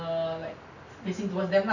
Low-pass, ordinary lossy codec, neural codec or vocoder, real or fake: 7.2 kHz; none; none; real